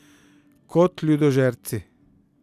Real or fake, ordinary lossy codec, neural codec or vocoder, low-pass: real; none; none; 14.4 kHz